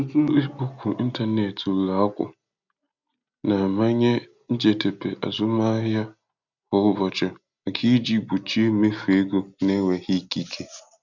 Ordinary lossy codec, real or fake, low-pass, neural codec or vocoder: none; real; 7.2 kHz; none